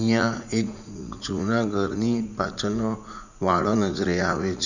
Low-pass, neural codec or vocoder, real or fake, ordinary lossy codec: 7.2 kHz; vocoder, 44.1 kHz, 80 mel bands, Vocos; fake; none